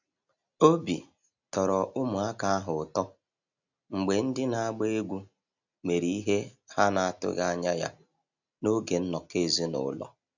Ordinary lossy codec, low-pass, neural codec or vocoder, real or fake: none; 7.2 kHz; none; real